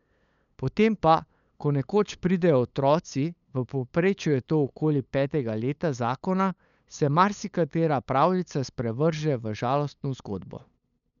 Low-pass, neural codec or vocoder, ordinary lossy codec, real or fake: 7.2 kHz; codec, 16 kHz, 8 kbps, FunCodec, trained on LibriTTS, 25 frames a second; none; fake